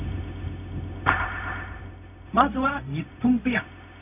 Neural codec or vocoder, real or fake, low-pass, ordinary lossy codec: codec, 16 kHz, 0.4 kbps, LongCat-Audio-Codec; fake; 3.6 kHz; none